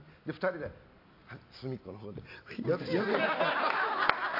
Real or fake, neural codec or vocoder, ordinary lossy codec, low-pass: real; none; AAC, 32 kbps; 5.4 kHz